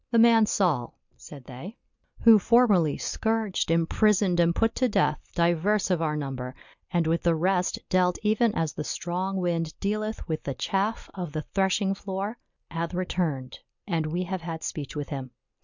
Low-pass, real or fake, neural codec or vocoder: 7.2 kHz; real; none